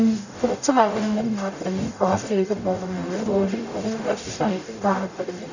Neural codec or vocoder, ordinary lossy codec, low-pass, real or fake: codec, 44.1 kHz, 0.9 kbps, DAC; none; 7.2 kHz; fake